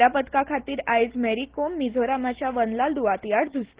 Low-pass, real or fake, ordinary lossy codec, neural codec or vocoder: 3.6 kHz; real; Opus, 16 kbps; none